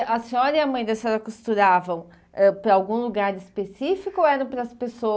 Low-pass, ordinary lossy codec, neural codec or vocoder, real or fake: none; none; none; real